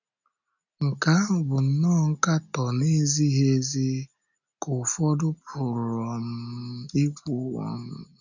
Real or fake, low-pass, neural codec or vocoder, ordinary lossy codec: real; 7.2 kHz; none; none